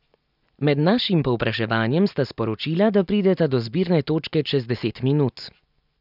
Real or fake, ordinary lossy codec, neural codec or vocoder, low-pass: real; none; none; 5.4 kHz